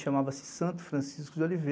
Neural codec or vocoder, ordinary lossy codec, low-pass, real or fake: none; none; none; real